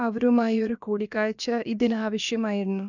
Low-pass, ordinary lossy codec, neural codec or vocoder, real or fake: 7.2 kHz; none; codec, 16 kHz, about 1 kbps, DyCAST, with the encoder's durations; fake